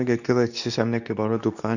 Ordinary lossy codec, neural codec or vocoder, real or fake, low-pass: none; codec, 24 kHz, 0.9 kbps, WavTokenizer, medium speech release version 2; fake; 7.2 kHz